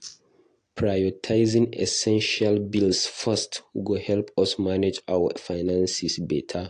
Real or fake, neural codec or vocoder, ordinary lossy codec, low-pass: real; none; AAC, 48 kbps; 9.9 kHz